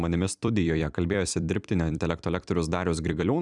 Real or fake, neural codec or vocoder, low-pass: fake; vocoder, 44.1 kHz, 128 mel bands every 256 samples, BigVGAN v2; 10.8 kHz